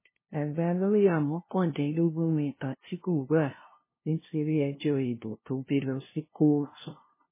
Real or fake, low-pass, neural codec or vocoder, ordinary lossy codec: fake; 3.6 kHz; codec, 16 kHz, 0.5 kbps, FunCodec, trained on LibriTTS, 25 frames a second; MP3, 16 kbps